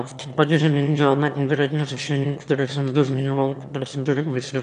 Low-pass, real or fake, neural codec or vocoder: 9.9 kHz; fake; autoencoder, 22.05 kHz, a latent of 192 numbers a frame, VITS, trained on one speaker